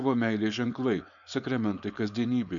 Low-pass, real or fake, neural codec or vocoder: 7.2 kHz; fake; codec, 16 kHz, 4.8 kbps, FACodec